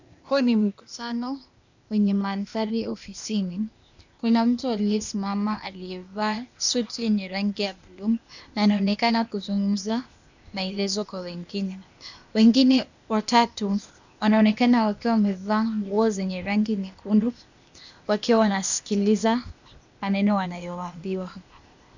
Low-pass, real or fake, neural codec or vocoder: 7.2 kHz; fake; codec, 16 kHz, 0.8 kbps, ZipCodec